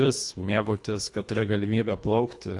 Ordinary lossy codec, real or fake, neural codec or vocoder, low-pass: AAC, 48 kbps; fake; codec, 24 kHz, 1.5 kbps, HILCodec; 10.8 kHz